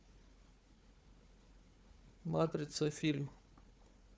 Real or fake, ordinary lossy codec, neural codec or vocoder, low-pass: fake; none; codec, 16 kHz, 4 kbps, FunCodec, trained on Chinese and English, 50 frames a second; none